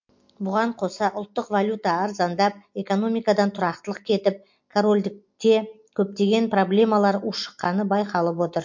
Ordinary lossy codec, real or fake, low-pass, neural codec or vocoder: MP3, 48 kbps; real; 7.2 kHz; none